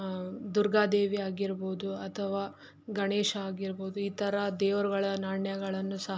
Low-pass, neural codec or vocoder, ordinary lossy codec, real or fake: none; none; none; real